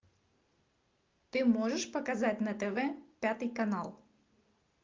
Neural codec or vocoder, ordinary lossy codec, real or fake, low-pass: none; Opus, 24 kbps; real; 7.2 kHz